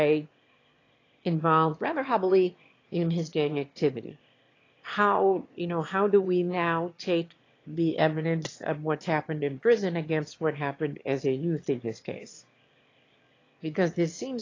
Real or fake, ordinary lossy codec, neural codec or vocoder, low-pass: fake; AAC, 32 kbps; autoencoder, 22.05 kHz, a latent of 192 numbers a frame, VITS, trained on one speaker; 7.2 kHz